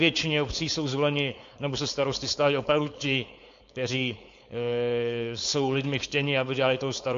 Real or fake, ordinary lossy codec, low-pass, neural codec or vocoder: fake; MP3, 48 kbps; 7.2 kHz; codec, 16 kHz, 4.8 kbps, FACodec